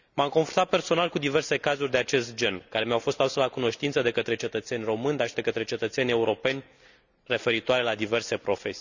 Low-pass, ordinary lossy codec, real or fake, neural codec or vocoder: 7.2 kHz; none; real; none